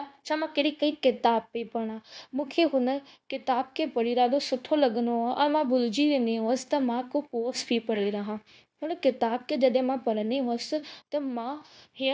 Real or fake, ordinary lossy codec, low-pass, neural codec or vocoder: fake; none; none; codec, 16 kHz, 0.9 kbps, LongCat-Audio-Codec